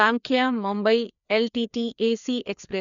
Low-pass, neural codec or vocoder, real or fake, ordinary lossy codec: 7.2 kHz; codec, 16 kHz, 2 kbps, FreqCodec, larger model; fake; none